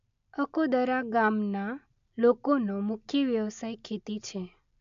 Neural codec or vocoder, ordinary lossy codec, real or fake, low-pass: none; AAC, 64 kbps; real; 7.2 kHz